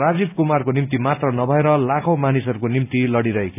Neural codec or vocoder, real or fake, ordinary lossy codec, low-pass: none; real; none; 3.6 kHz